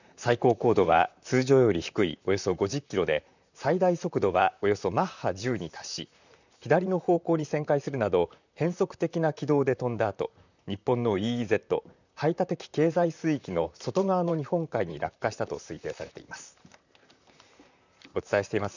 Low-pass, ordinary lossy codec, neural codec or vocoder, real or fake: 7.2 kHz; none; vocoder, 44.1 kHz, 128 mel bands, Pupu-Vocoder; fake